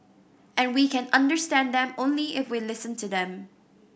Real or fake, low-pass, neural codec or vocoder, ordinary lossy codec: real; none; none; none